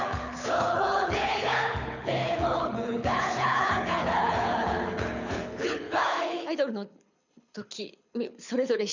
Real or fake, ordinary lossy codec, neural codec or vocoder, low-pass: fake; none; codec, 24 kHz, 6 kbps, HILCodec; 7.2 kHz